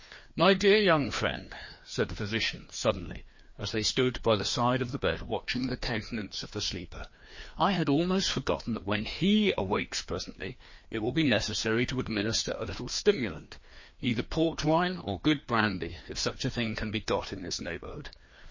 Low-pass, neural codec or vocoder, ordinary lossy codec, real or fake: 7.2 kHz; codec, 16 kHz, 2 kbps, FreqCodec, larger model; MP3, 32 kbps; fake